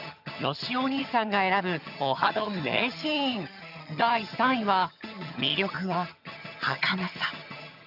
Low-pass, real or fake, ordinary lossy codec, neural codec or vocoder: 5.4 kHz; fake; none; vocoder, 22.05 kHz, 80 mel bands, HiFi-GAN